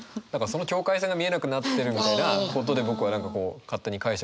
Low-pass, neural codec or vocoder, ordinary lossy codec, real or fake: none; none; none; real